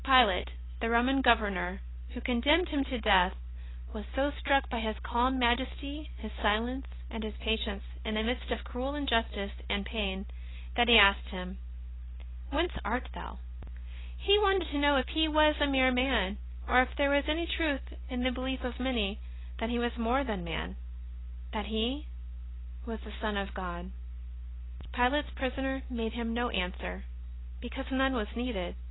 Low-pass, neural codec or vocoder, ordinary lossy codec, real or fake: 7.2 kHz; none; AAC, 16 kbps; real